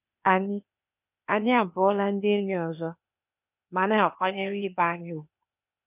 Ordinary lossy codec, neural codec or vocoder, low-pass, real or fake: none; codec, 16 kHz, 0.8 kbps, ZipCodec; 3.6 kHz; fake